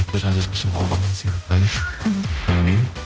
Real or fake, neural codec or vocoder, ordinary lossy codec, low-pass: fake; codec, 16 kHz, 0.5 kbps, X-Codec, HuBERT features, trained on general audio; none; none